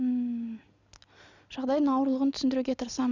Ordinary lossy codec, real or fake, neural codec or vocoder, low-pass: none; real; none; 7.2 kHz